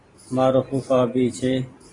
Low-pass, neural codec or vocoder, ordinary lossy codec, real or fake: 10.8 kHz; vocoder, 44.1 kHz, 128 mel bands every 512 samples, BigVGAN v2; AAC, 32 kbps; fake